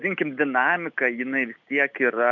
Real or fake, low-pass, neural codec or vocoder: real; 7.2 kHz; none